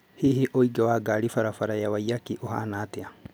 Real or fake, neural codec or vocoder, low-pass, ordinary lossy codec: real; none; none; none